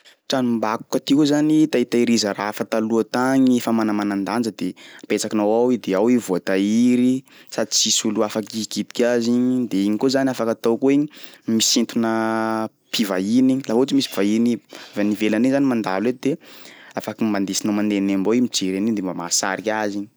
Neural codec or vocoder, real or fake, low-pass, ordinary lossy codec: none; real; none; none